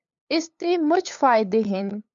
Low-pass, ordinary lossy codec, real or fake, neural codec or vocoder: 7.2 kHz; MP3, 96 kbps; fake; codec, 16 kHz, 8 kbps, FunCodec, trained on LibriTTS, 25 frames a second